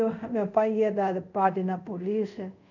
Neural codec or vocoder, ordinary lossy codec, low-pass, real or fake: codec, 24 kHz, 0.5 kbps, DualCodec; none; 7.2 kHz; fake